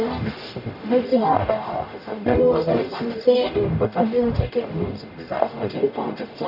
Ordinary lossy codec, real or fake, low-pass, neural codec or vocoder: none; fake; 5.4 kHz; codec, 44.1 kHz, 0.9 kbps, DAC